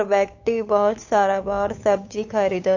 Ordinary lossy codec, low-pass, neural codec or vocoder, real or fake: AAC, 48 kbps; 7.2 kHz; codec, 16 kHz, 8 kbps, FunCodec, trained on Chinese and English, 25 frames a second; fake